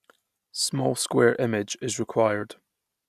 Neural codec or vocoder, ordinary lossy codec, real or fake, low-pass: none; none; real; 14.4 kHz